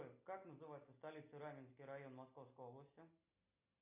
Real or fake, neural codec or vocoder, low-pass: real; none; 3.6 kHz